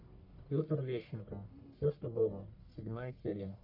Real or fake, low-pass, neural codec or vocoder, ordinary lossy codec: fake; 5.4 kHz; codec, 44.1 kHz, 1.7 kbps, Pupu-Codec; MP3, 32 kbps